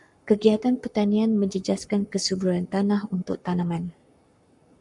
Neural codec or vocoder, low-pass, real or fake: codec, 44.1 kHz, 7.8 kbps, Pupu-Codec; 10.8 kHz; fake